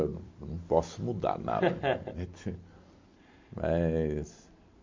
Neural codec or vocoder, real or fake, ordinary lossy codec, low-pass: none; real; MP3, 48 kbps; 7.2 kHz